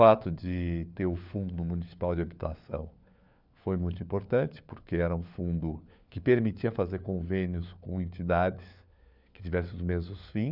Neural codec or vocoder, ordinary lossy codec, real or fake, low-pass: codec, 16 kHz, 4 kbps, FunCodec, trained on LibriTTS, 50 frames a second; none; fake; 5.4 kHz